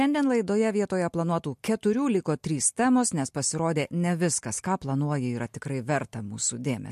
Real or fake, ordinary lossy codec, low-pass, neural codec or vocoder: real; MP3, 64 kbps; 14.4 kHz; none